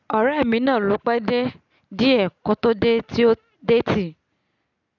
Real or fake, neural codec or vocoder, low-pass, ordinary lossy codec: real; none; 7.2 kHz; none